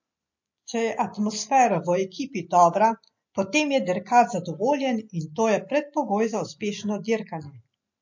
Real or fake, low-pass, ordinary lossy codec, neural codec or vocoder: real; 7.2 kHz; MP3, 48 kbps; none